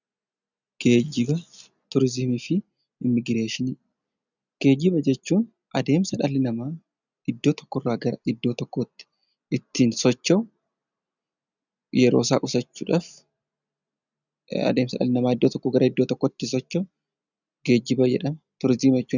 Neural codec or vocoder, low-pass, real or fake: none; 7.2 kHz; real